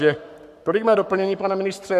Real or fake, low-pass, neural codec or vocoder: fake; 14.4 kHz; codec, 44.1 kHz, 7.8 kbps, Pupu-Codec